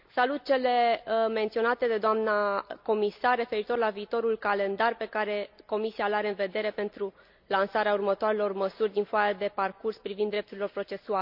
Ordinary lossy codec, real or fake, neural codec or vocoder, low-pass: none; real; none; 5.4 kHz